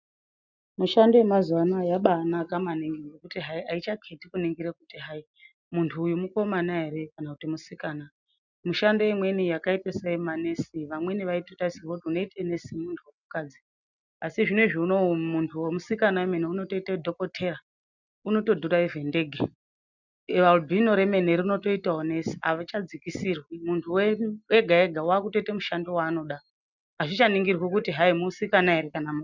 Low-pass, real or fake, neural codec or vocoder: 7.2 kHz; real; none